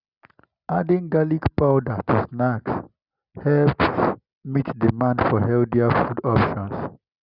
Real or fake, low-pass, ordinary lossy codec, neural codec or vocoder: real; 5.4 kHz; Opus, 64 kbps; none